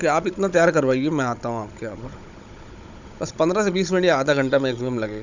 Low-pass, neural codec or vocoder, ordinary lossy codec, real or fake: 7.2 kHz; codec, 16 kHz, 16 kbps, FunCodec, trained on Chinese and English, 50 frames a second; none; fake